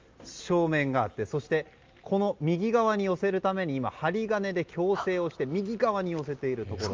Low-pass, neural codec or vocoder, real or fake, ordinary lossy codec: 7.2 kHz; none; real; Opus, 32 kbps